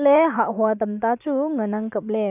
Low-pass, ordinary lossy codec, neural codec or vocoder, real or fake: 3.6 kHz; none; vocoder, 44.1 kHz, 128 mel bands every 512 samples, BigVGAN v2; fake